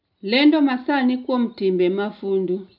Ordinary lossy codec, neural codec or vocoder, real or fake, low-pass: none; none; real; 5.4 kHz